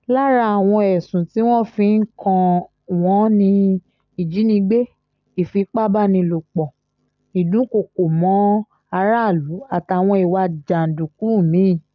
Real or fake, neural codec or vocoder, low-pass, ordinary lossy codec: real; none; 7.2 kHz; none